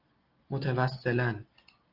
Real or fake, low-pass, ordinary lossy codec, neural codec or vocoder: real; 5.4 kHz; Opus, 32 kbps; none